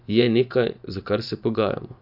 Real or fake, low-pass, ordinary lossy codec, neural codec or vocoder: fake; 5.4 kHz; none; vocoder, 24 kHz, 100 mel bands, Vocos